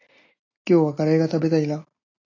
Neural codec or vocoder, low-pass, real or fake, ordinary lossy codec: none; 7.2 kHz; real; AAC, 32 kbps